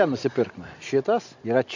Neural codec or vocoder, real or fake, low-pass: none; real; 7.2 kHz